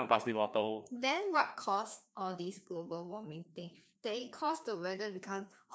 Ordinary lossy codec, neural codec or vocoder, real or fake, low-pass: none; codec, 16 kHz, 2 kbps, FreqCodec, larger model; fake; none